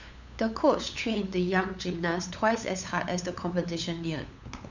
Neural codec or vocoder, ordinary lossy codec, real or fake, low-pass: codec, 16 kHz, 8 kbps, FunCodec, trained on LibriTTS, 25 frames a second; none; fake; 7.2 kHz